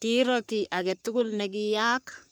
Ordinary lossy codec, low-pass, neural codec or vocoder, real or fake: none; none; codec, 44.1 kHz, 3.4 kbps, Pupu-Codec; fake